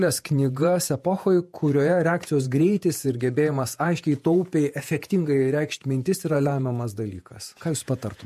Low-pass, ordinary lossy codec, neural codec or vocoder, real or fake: 14.4 kHz; MP3, 64 kbps; vocoder, 48 kHz, 128 mel bands, Vocos; fake